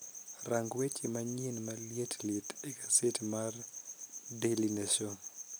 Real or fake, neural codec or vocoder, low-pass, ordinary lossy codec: real; none; none; none